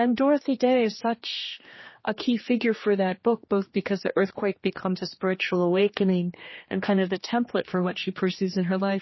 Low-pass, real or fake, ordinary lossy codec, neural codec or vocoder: 7.2 kHz; fake; MP3, 24 kbps; codec, 16 kHz, 2 kbps, X-Codec, HuBERT features, trained on general audio